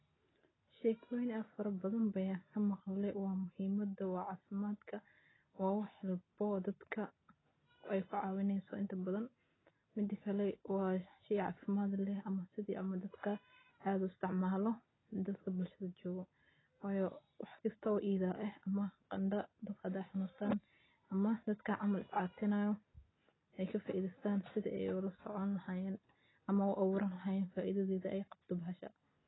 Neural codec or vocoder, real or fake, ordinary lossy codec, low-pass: none; real; AAC, 16 kbps; 7.2 kHz